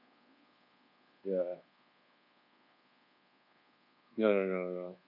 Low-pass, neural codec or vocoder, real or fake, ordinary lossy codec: 5.4 kHz; codec, 24 kHz, 1.2 kbps, DualCodec; fake; none